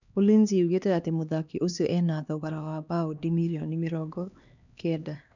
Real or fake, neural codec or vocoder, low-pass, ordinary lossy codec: fake; codec, 16 kHz, 2 kbps, X-Codec, HuBERT features, trained on LibriSpeech; 7.2 kHz; none